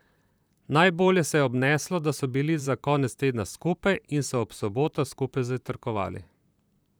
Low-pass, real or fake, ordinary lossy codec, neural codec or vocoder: none; fake; none; vocoder, 44.1 kHz, 128 mel bands, Pupu-Vocoder